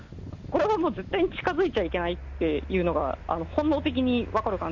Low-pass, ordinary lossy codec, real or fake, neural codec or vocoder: 7.2 kHz; none; real; none